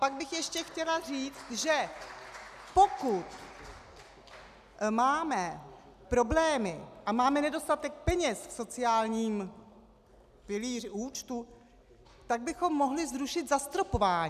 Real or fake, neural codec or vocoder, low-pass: real; none; 14.4 kHz